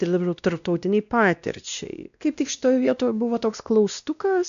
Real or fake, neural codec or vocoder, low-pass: fake; codec, 16 kHz, 1 kbps, X-Codec, WavLM features, trained on Multilingual LibriSpeech; 7.2 kHz